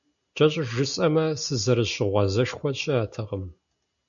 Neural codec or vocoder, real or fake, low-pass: none; real; 7.2 kHz